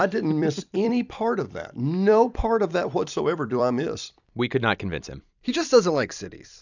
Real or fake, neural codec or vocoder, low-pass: fake; vocoder, 44.1 kHz, 128 mel bands every 256 samples, BigVGAN v2; 7.2 kHz